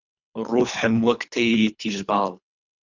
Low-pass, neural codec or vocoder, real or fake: 7.2 kHz; codec, 24 kHz, 3 kbps, HILCodec; fake